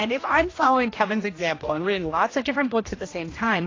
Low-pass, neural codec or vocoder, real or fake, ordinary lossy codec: 7.2 kHz; codec, 16 kHz, 1 kbps, X-Codec, HuBERT features, trained on general audio; fake; AAC, 32 kbps